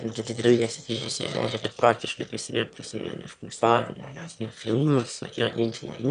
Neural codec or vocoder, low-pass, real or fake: autoencoder, 22.05 kHz, a latent of 192 numbers a frame, VITS, trained on one speaker; 9.9 kHz; fake